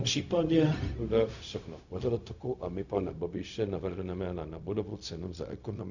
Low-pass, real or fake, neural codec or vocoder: 7.2 kHz; fake; codec, 16 kHz, 0.4 kbps, LongCat-Audio-Codec